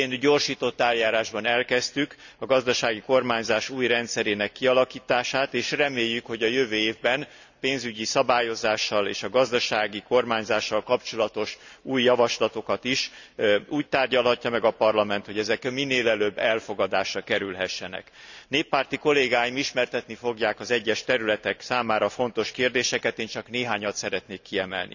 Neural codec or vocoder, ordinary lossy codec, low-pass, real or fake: none; none; 7.2 kHz; real